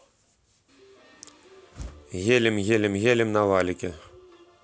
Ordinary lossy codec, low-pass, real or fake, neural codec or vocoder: none; none; real; none